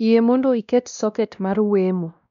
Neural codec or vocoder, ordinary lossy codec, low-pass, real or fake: codec, 16 kHz, 1 kbps, X-Codec, WavLM features, trained on Multilingual LibriSpeech; none; 7.2 kHz; fake